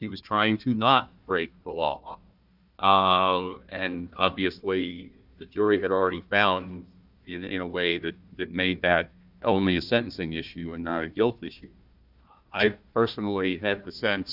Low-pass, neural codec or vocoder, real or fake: 5.4 kHz; codec, 16 kHz, 1 kbps, FunCodec, trained on Chinese and English, 50 frames a second; fake